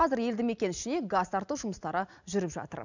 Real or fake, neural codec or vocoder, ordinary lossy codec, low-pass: real; none; none; 7.2 kHz